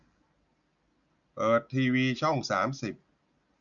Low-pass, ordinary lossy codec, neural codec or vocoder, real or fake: 7.2 kHz; none; none; real